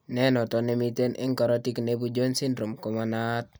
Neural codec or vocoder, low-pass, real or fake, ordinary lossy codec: none; none; real; none